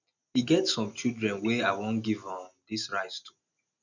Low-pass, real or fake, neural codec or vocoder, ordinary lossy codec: 7.2 kHz; real; none; none